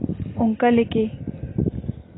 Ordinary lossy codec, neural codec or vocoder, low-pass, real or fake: AAC, 16 kbps; none; 7.2 kHz; real